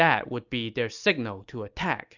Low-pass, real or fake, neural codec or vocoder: 7.2 kHz; real; none